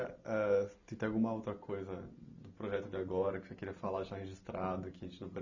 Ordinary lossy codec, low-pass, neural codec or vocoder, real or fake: none; 7.2 kHz; none; real